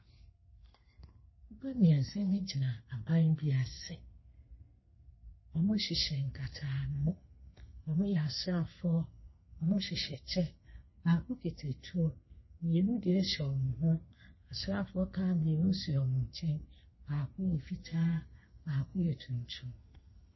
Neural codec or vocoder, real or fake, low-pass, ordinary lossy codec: codec, 32 kHz, 1.9 kbps, SNAC; fake; 7.2 kHz; MP3, 24 kbps